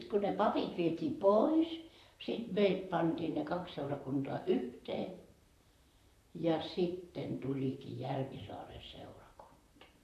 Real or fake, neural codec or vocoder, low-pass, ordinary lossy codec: fake; vocoder, 44.1 kHz, 128 mel bands, Pupu-Vocoder; 14.4 kHz; AAC, 64 kbps